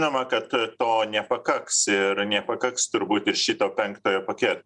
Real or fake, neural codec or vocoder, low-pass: real; none; 10.8 kHz